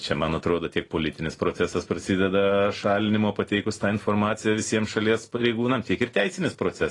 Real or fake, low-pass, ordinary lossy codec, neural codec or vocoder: real; 10.8 kHz; AAC, 32 kbps; none